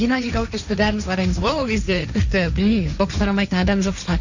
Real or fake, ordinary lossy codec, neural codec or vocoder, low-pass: fake; none; codec, 16 kHz, 1.1 kbps, Voila-Tokenizer; 7.2 kHz